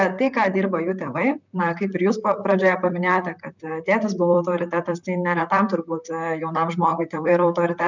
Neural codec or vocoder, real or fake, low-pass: vocoder, 44.1 kHz, 128 mel bands, Pupu-Vocoder; fake; 7.2 kHz